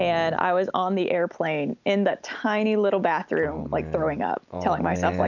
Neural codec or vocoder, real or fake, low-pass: none; real; 7.2 kHz